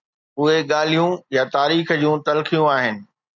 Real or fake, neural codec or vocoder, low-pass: real; none; 7.2 kHz